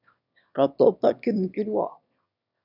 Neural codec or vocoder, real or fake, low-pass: autoencoder, 22.05 kHz, a latent of 192 numbers a frame, VITS, trained on one speaker; fake; 5.4 kHz